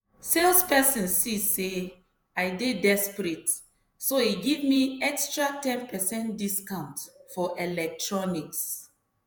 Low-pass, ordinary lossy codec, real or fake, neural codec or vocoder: none; none; fake; vocoder, 48 kHz, 128 mel bands, Vocos